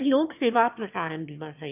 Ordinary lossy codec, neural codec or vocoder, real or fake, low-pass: none; autoencoder, 22.05 kHz, a latent of 192 numbers a frame, VITS, trained on one speaker; fake; 3.6 kHz